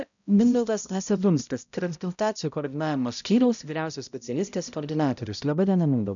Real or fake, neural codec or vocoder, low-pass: fake; codec, 16 kHz, 0.5 kbps, X-Codec, HuBERT features, trained on balanced general audio; 7.2 kHz